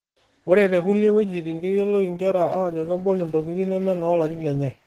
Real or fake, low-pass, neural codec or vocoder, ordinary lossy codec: fake; 14.4 kHz; codec, 32 kHz, 1.9 kbps, SNAC; Opus, 16 kbps